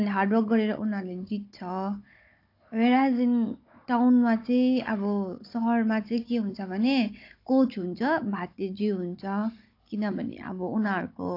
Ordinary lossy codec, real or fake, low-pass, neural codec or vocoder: AAC, 32 kbps; fake; 5.4 kHz; codec, 16 kHz, 16 kbps, FunCodec, trained on Chinese and English, 50 frames a second